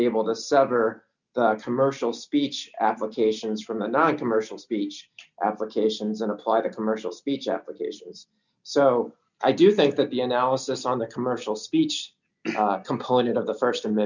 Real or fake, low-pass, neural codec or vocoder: real; 7.2 kHz; none